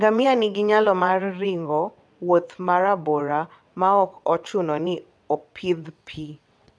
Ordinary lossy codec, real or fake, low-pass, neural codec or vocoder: none; fake; none; vocoder, 22.05 kHz, 80 mel bands, WaveNeXt